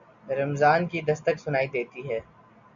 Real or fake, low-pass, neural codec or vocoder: real; 7.2 kHz; none